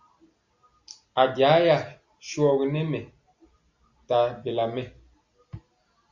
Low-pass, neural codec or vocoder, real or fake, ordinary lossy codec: 7.2 kHz; none; real; Opus, 64 kbps